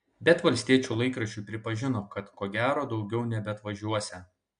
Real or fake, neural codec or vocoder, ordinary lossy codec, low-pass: real; none; MP3, 64 kbps; 9.9 kHz